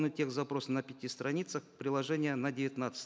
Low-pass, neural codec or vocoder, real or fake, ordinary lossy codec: none; none; real; none